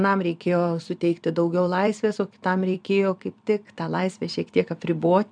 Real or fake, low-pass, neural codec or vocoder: fake; 9.9 kHz; vocoder, 44.1 kHz, 128 mel bands every 256 samples, BigVGAN v2